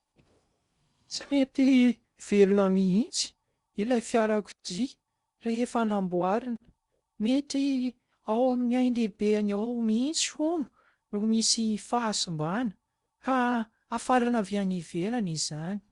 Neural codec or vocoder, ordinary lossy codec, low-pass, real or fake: codec, 16 kHz in and 24 kHz out, 0.6 kbps, FocalCodec, streaming, 2048 codes; Opus, 64 kbps; 10.8 kHz; fake